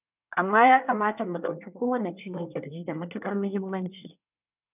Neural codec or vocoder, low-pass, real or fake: codec, 24 kHz, 1 kbps, SNAC; 3.6 kHz; fake